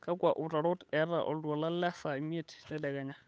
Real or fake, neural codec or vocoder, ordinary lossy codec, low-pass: fake; codec, 16 kHz, 8 kbps, FunCodec, trained on Chinese and English, 25 frames a second; none; none